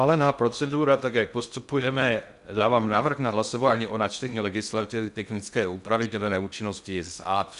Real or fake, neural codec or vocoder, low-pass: fake; codec, 16 kHz in and 24 kHz out, 0.6 kbps, FocalCodec, streaming, 2048 codes; 10.8 kHz